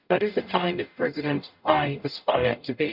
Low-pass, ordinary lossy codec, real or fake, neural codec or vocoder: 5.4 kHz; none; fake; codec, 44.1 kHz, 0.9 kbps, DAC